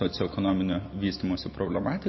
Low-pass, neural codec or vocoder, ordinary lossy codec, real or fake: 7.2 kHz; none; MP3, 24 kbps; real